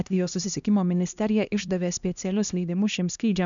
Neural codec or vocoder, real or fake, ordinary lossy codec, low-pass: codec, 16 kHz, 1 kbps, X-Codec, HuBERT features, trained on LibriSpeech; fake; MP3, 96 kbps; 7.2 kHz